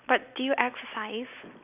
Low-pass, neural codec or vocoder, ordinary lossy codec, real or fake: 3.6 kHz; none; none; real